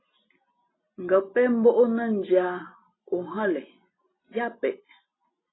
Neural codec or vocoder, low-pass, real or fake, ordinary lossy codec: none; 7.2 kHz; real; AAC, 16 kbps